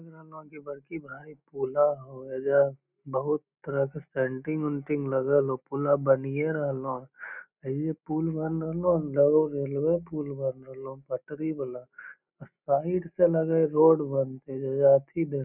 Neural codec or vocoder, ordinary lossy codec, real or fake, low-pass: none; none; real; 3.6 kHz